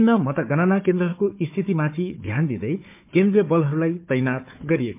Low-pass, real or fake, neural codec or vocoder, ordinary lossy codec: 3.6 kHz; fake; codec, 16 kHz, 4 kbps, FunCodec, trained on Chinese and English, 50 frames a second; MP3, 32 kbps